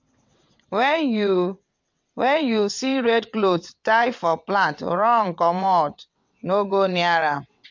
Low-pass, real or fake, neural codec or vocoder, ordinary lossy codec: 7.2 kHz; fake; vocoder, 22.05 kHz, 80 mel bands, WaveNeXt; MP3, 48 kbps